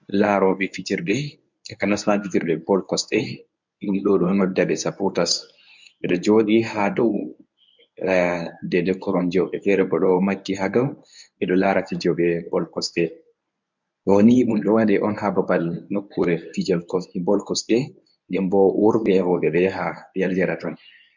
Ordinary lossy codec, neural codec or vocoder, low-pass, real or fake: MP3, 64 kbps; codec, 24 kHz, 0.9 kbps, WavTokenizer, medium speech release version 1; 7.2 kHz; fake